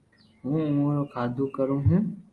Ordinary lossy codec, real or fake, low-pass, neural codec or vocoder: Opus, 32 kbps; real; 10.8 kHz; none